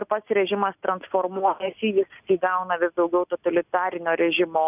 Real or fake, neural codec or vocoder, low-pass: real; none; 3.6 kHz